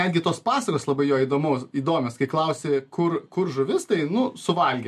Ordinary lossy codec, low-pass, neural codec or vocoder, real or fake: MP3, 64 kbps; 14.4 kHz; none; real